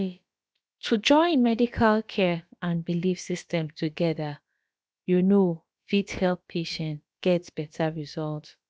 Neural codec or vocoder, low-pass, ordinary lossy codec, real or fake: codec, 16 kHz, about 1 kbps, DyCAST, with the encoder's durations; none; none; fake